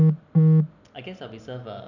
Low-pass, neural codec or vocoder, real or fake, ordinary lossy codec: 7.2 kHz; none; real; none